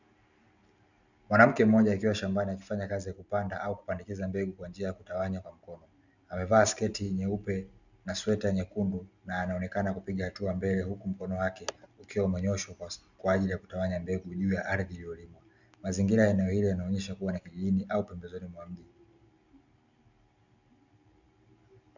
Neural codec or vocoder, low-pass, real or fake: none; 7.2 kHz; real